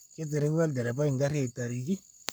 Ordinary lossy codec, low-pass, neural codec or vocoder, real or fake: none; none; codec, 44.1 kHz, 7.8 kbps, Pupu-Codec; fake